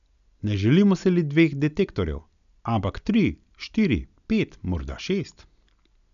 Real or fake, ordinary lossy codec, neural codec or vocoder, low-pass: real; none; none; 7.2 kHz